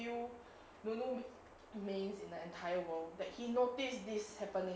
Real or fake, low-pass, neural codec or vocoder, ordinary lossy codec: real; none; none; none